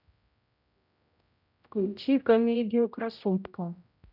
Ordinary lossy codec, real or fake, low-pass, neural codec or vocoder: none; fake; 5.4 kHz; codec, 16 kHz, 0.5 kbps, X-Codec, HuBERT features, trained on general audio